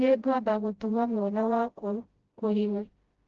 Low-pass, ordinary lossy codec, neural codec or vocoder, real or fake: 7.2 kHz; Opus, 16 kbps; codec, 16 kHz, 0.5 kbps, FreqCodec, smaller model; fake